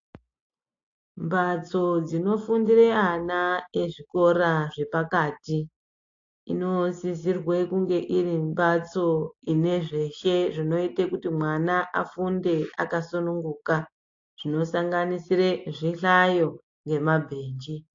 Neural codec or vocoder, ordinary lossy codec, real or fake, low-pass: none; AAC, 48 kbps; real; 7.2 kHz